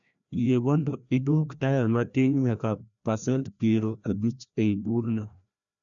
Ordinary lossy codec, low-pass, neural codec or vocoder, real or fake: none; 7.2 kHz; codec, 16 kHz, 1 kbps, FreqCodec, larger model; fake